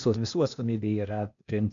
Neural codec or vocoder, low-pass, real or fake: codec, 16 kHz, 0.8 kbps, ZipCodec; 7.2 kHz; fake